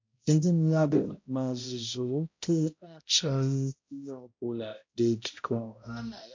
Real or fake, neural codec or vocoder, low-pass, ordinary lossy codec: fake; codec, 16 kHz, 0.5 kbps, X-Codec, HuBERT features, trained on balanced general audio; 7.2 kHz; MP3, 48 kbps